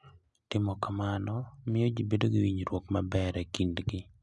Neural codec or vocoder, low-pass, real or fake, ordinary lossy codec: none; 10.8 kHz; real; none